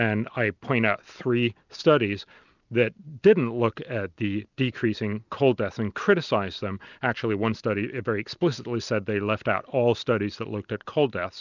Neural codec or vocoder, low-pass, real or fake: none; 7.2 kHz; real